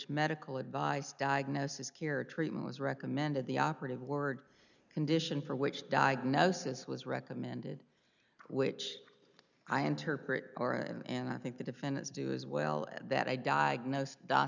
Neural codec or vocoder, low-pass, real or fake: none; 7.2 kHz; real